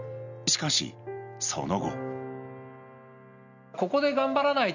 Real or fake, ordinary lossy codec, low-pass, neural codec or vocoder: real; none; 7.2 kHz; none